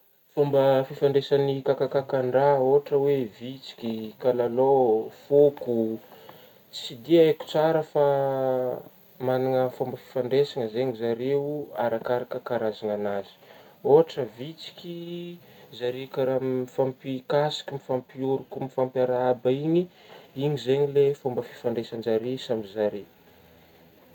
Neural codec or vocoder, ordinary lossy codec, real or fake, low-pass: none; none; real; 19.8 kHz